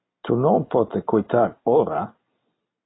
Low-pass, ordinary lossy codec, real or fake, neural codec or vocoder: 7.2 kHz; AAC, 16 kbps; real; none